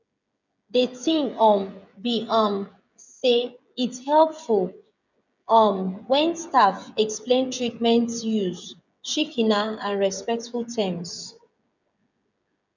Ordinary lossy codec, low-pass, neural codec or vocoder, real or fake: none; 7.2 kHz; codec, 16 kHz, 16 kbps, FreqCodec, smaller model; fake